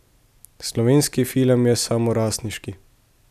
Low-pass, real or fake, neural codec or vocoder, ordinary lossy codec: 14.4 kHz; real; none; none